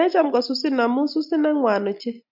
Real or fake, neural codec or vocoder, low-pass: real; none; 5.4 kHz